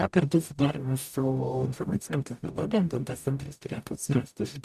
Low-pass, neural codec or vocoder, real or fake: 14.4 kHz; codec, 44.1 kHz, 0.9 kbps, DAC; fake